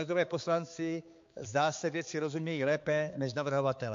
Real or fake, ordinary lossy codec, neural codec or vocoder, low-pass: fake; MP3, 48 kbps; codec, 16 kHz, 4 kbps, X-Codec, HuBERT features, trained on balanced general audio; 7.2 kHz